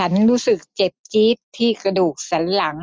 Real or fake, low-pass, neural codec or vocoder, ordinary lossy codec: real; none; none; none